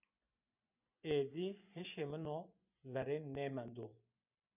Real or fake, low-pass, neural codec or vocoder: fake; 3.6 kHz; vocoder, 24 kHz, 100 mel bands, Vocos